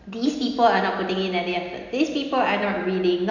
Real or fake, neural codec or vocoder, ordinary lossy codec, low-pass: real; none; none; 7.2 kHz